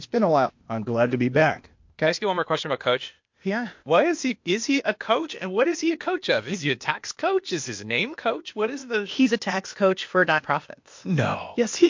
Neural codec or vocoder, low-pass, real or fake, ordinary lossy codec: codec, 16 kHz, 0.8 kbps, ZipCodec; 7.2 kHz; fake; MP3, 48 kbps